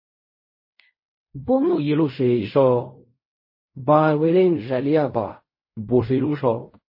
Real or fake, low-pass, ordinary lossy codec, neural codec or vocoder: fake; 5.4 kHz; MP3, 24 kbps; codec, 16 kHz in and 24 kHz out, 0.4 kbps, LongCat-Audio-Codec, fine tuned four codebook decoder